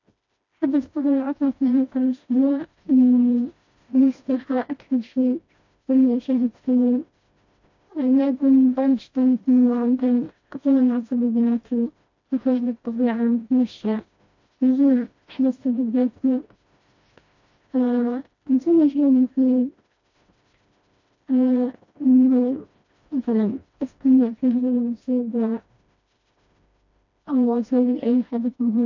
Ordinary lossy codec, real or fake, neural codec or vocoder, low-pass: AAC, 48 kbps; fake; codec, 16 kHz, 1 kbps, FreqCodec, smaller model; 7.2 kHz